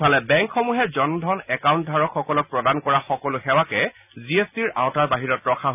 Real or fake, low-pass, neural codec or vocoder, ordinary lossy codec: real; 3.6 kHz; none; none